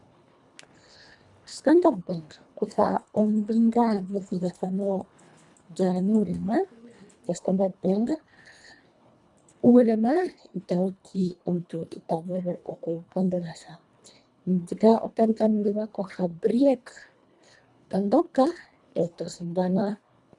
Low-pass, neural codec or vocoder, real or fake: 10.8 kHz; codec, 24 kHz, 1.5 kbps, HILCodec; fake